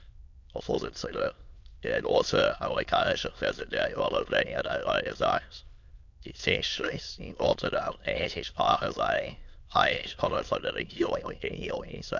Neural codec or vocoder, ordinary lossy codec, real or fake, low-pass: autoencoder, 22.05 kHz, a latent of 192 numbers a frame, VITS, trained on many speakers; MP3, 64 kbps; fake; 7.2 kHz